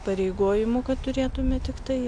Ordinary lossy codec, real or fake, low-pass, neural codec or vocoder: MP3, 96 kbps; real; 9.9 kHz; none